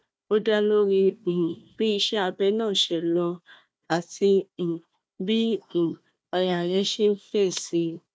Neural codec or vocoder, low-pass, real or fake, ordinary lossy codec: codec, 16 kHz, 1 kbps, FunCodec, trained on Chinese and English, 50 frames a second; none; fake; none